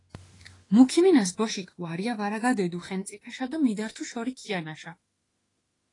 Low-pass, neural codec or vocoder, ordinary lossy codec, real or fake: 10.8 kHz; autoencoder, 48 kHz, 32 numbers a frame, DAC-VAE, trained on Japanese speech; AAC, 32 kbps; fake